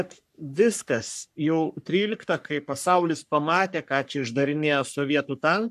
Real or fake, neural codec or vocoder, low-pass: fake; codec, 44.1 kHz, 3.4 kbps, Pupu-Codec; 14.4 kHz